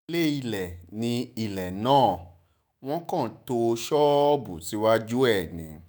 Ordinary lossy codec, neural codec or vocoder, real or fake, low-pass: none; autoencoder, 48 kHz, 128 numbers a frame, DAC-VAE, trained on Japanese speech; fake; none